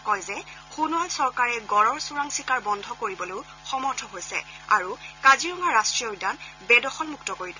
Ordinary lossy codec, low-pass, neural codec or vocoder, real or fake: none; 7.2 kHz; none; real